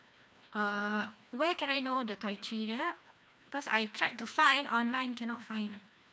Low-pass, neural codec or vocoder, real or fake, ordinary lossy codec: none; codec, 16 kHz, 1 kbps, FreqCodec, larger model; fake; none